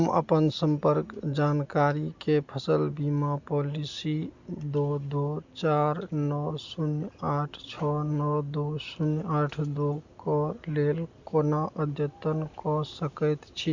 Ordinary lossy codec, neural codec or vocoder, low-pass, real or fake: Opus, 64 kbps; none; 7.2 kHz; real